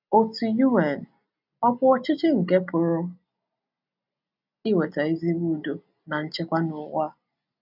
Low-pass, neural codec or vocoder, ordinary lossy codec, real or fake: 5.4 kHz; none; none; real